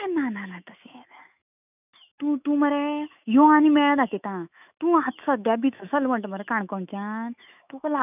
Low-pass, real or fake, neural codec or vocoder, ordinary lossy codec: 3.6 kHz; fake; autoencoder, 48 kHz, 128 numbers a frame, DAC-VAE, trained on Japanese speech; none